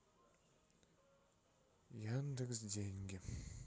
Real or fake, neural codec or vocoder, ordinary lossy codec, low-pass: real; none; none; none